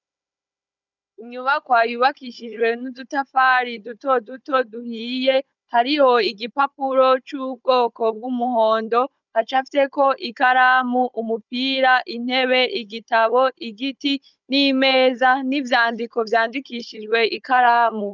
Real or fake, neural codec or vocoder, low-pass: fake; codec, 16 kHz, 16 kbps, FunCodec, trained on Chinese and English, 50 frames a second; 7.2 kHz